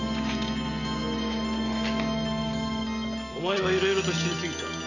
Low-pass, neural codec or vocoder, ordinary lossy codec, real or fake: 7.2 kHz; none; none; real